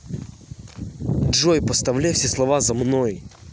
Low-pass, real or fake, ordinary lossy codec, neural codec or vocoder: none; real; none; none